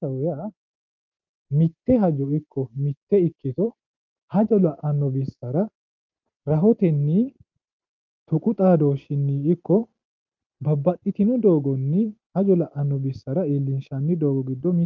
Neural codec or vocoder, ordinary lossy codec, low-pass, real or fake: none; Opus, 32 kbps; 7.2 kHz; real